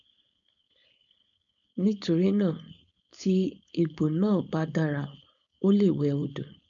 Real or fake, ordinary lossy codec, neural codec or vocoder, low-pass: fake; none; codec, 16 kHz, 4.8 kbps, FACodec; 7.2 kHz